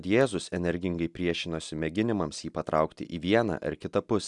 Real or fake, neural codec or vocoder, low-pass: real; none; 10.8 kHz